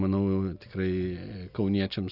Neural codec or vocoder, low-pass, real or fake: none; 5.4 kHz; real